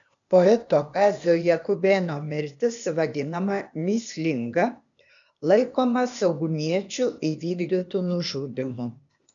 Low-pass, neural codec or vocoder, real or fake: 7.2 kHz; codec, 16 kHz, 0.8 kbps, ZipCodec; fake